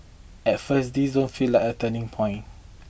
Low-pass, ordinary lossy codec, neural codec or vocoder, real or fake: none; none; none; real